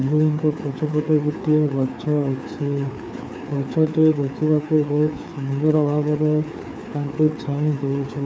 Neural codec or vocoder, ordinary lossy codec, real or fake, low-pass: codec, 16 kHz, 4 kbps, FreqCodec, larger model; none; fake; none